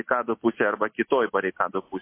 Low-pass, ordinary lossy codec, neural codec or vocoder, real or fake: 3.6 kHz; MP3, 24 kbps; none; real